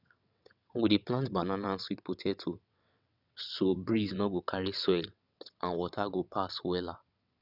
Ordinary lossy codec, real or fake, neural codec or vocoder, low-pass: none; fake; vocoder, 22.05 kHz, 80 mel bands, WaveNeXt; 5.4 kHz